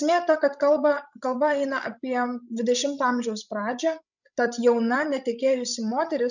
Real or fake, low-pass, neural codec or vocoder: fake; 7.2 kHz; codec, 16 kHz, 16 kbps, FreqCodec, larger model